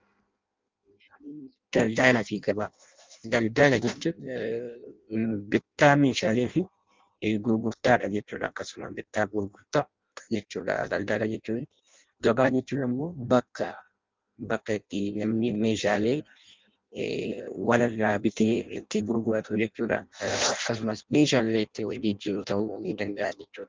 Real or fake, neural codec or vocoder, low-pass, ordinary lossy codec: fake; codec, 16 kHz in and 24 kHz out, 0.6 kbps, FireRedTTS-2 codec; 7.2 kHz; Opus, 24 kbps